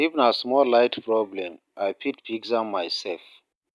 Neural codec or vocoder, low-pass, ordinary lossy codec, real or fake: none; none; none; real